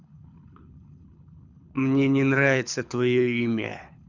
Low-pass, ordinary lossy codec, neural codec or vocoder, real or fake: 7.2 kHz; none; codec, 24 kHz, 6 kbps, HILCodec; fake